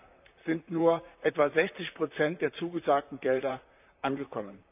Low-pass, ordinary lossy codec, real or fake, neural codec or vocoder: 3.6 kHz; none; fake; vocoder, 44.1 kHz, 128 mel bands, Pupu-Vocoder